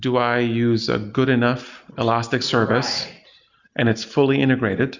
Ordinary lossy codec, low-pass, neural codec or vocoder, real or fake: Opus, 64 kbps; 7.2 kHz; none; real